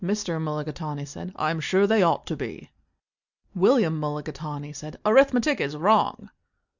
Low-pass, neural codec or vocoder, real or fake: 7.2 kHz; none; real